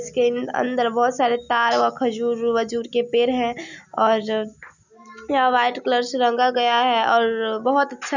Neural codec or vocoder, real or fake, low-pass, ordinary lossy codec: none; real; 7.2 kHz; none